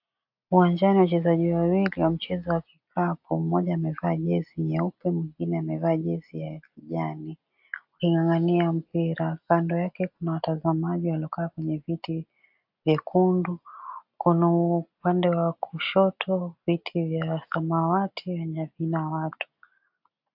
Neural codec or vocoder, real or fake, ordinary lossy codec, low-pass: none; real; AAC, 48 kbps; 5.4 kHz